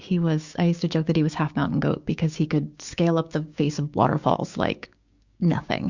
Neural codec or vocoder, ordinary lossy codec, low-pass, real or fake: codec, 16 kHz, 6 kbps, DAC; Opus, 64 kbps; 7.2 kHz; fake